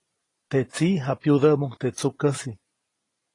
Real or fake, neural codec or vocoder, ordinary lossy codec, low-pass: real; none; AAC, 32 kbps; 10.8 kHz